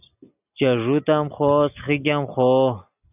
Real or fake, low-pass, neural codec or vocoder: real; 3.6 kHz; none